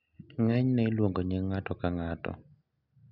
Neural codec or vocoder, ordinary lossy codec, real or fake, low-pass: none; none; real; 5.4 kHz